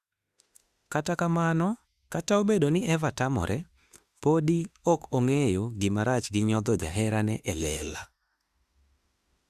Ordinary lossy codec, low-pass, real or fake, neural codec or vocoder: Opus, 64 kbps; 14.4 kHz; fake; autoencoder, 48 kHz, 32 numbers a frame, DAC-VAE, trained on Japanese speech